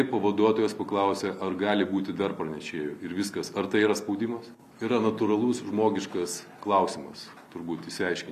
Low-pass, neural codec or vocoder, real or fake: 14.4 kHz; none; real